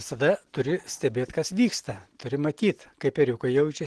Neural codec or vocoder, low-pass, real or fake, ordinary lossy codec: none; 10.8 kHz; real; Opus, 16 kbps